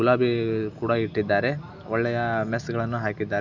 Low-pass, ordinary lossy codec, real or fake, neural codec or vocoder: 7.2 kHz; none; real; none